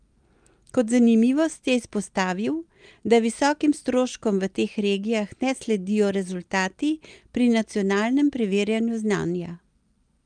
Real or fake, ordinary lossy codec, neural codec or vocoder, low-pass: real; Opus, 32 kbps; none; 9.9 kHz